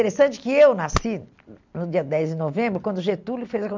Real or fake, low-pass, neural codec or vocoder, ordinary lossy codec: real; 7.2 kHz; none; MP3, 64 kbps